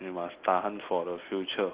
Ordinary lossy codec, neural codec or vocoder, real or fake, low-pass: Opus, 16 kbps; none; real; 3.6 kHz